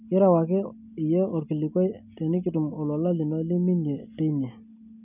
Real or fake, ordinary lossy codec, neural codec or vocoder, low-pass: real; none; none; 3.6 kHz